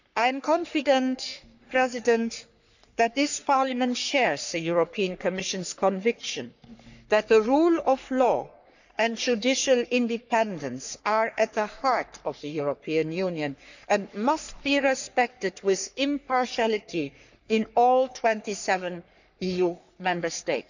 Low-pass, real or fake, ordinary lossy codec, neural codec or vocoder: 7.2 kHz; fake; none; codec, 44.1 kHz, 3.4 kbps, Pupu-Codec